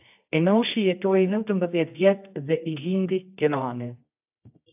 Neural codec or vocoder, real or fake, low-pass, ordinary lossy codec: codec, 24 kHz, 0.9 kbps, WavTokenizer, medium music audio release; fake; 3.6 kHz; AAC, 32 kbps